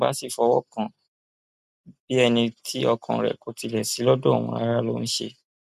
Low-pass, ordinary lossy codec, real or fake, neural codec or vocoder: 14.4 kHz; none; real; none